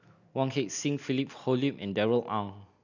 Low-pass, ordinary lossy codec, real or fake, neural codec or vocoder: 7.2 kHz; none; real; none